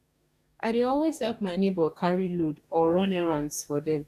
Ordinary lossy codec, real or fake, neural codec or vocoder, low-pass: none; fake; codec, 44.1 kHz, 2.6 kbps, DAC; 14.4 kHz